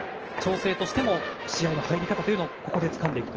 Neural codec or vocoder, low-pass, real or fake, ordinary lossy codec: none; 7.2 kHz; real; Opus, 16 kbps